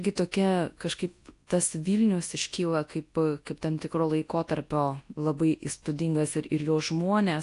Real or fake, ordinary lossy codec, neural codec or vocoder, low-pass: fake; AAC, 48 kbps; codec, 24 kHz, 0.9 kbps, WavTokenizer, large speech release; 10.8 kHz